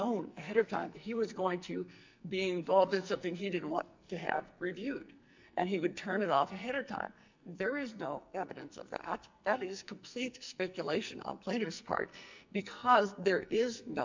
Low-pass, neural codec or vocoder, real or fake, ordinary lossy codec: 7.2 kHz; codec, 44.1 kHz, 2.6 kbps, SNAC; fake; MP3, 64 kbps